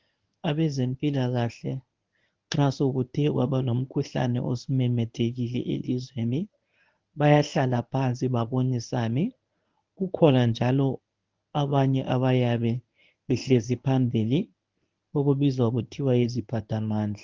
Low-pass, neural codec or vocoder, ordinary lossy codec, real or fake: 7.2 kHz; codec, 24 kHz, 0.9 kbps, WavTokenizer, medium speech release version 1; Opus, 32 kbps; fake